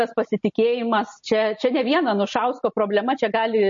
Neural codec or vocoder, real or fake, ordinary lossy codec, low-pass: none; real; MP3, 32 kbps; 7.2 kHz